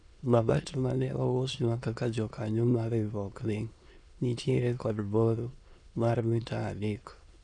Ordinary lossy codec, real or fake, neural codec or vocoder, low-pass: none; fake; autoencoder, 22.05 kHz, a latent of 192 numbers a frame, VITS, trained on many speakers; 9.9 kHz